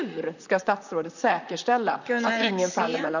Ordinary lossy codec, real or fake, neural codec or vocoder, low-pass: none; fake; vocoder, 44.1 kHz, 128 mel bands, Pupu-Vocoder; 7.2 kHz